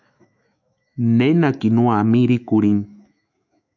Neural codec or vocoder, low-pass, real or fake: autoencoder, 48 kHz, 128 numbers a frame, DAC-VAE, trained on Japanese speech; 7.2 kHz; fake